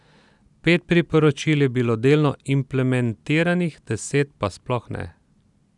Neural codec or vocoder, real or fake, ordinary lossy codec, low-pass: none; real; none; 10.8 kHz